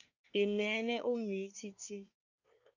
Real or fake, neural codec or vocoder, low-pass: fake; codec, 24 kHz, 1 kbps, SNAC; 7.2 kHz